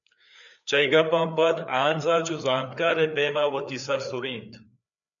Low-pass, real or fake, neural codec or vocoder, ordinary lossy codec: 7.2 kHz; fake; codec, 16 kHz, 4 kbps, FreqCodec, larger model; MP3, 96 kbps